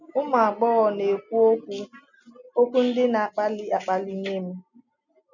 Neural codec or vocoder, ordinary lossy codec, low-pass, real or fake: none; none; 7.2 kHz; real